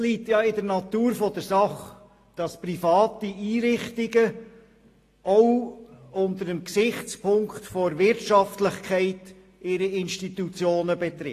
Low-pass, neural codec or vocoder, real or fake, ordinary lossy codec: 14.4 kHz; none; real; AAC, 48 kbps